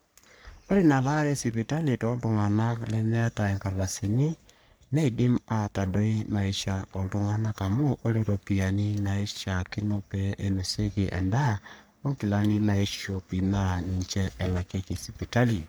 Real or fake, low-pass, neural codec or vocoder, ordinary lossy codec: fake; none; codec, 44.1 kHz, 3.4 kbps, Pupu-Codec; none